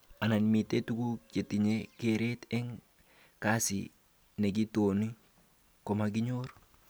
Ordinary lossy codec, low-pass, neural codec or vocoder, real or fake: none; none; none; real